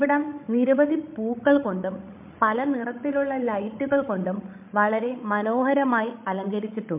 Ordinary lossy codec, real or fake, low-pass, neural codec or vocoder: MP3, 32 kbps; fake; 3.6 kHz; codec, 16 kHz, 16 kbps, FreqCodec, larger model